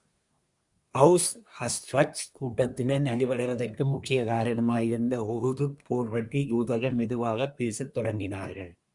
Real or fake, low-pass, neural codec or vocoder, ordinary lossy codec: fake; 10.8 kHz; codec, 24 kHz, 1 kbps, SNAC; Opus, 64 kbps